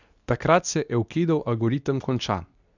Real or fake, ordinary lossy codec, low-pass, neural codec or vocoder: fake; none; 7.2 kHz; codec, 24 kHz, 0.9 kbps, WavTokenizer, medium speech release version 2